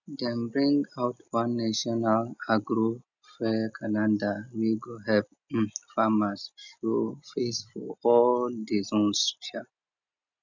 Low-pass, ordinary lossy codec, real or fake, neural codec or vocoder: 7.2 kHz; none; real; none